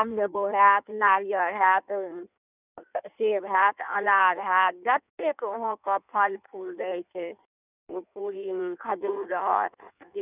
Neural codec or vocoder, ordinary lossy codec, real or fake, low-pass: codec, 16 kHz in and 24 kHz out, 1.1 kbps, FireRedTTS-2 codec; none; fake; 3.6 kHz